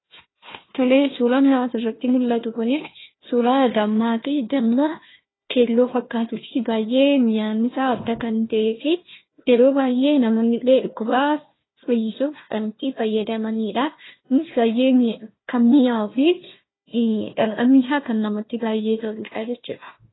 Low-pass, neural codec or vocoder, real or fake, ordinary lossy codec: 7.2 kHz; codec, 16 kHz, 1 kbps, FunCodec, trained on Chinese and English, 50 frames a second; fake; AAC, 16 kbps